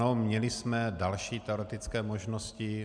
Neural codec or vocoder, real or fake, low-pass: none; real; 9.9 kHz